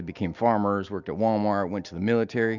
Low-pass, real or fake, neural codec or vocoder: 7.2 kHz; real; none